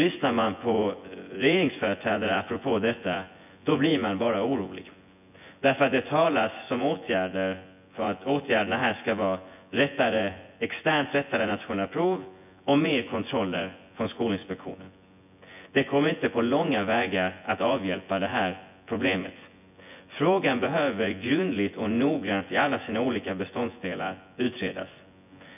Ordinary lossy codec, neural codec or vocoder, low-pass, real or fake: none; vocoder, 24 kHz, 100 mel bands, Vocos; 3.6 kHz; fake